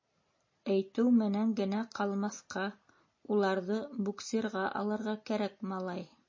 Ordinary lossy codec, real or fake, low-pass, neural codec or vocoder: MP3, 32 kbps; real; 7.2 kHz; none